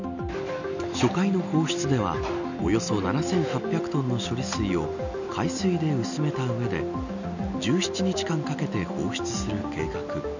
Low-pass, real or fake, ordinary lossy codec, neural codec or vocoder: 7.2 kHz; real; none; none